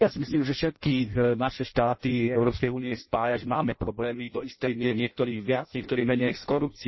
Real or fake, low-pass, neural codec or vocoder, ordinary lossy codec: fake; 7.2 kHz; codec, 16 kHz in and 24 kHz out, 0.6 kbps, FireRedTTS-2 codec; MP3, 24 kbps